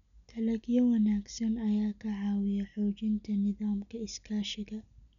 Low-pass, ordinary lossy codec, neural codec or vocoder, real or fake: 7.2 kHz; none; none; real